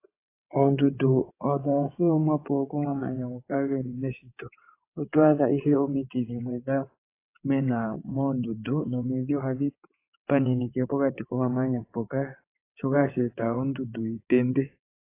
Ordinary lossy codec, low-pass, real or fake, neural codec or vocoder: AAC, 24 kbps; 3.6 kHz; fake; vocoder, 22.05 kHz, 80 mel bands, WaveNeXt